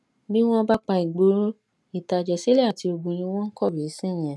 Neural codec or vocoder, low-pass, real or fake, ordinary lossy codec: none; none; real; none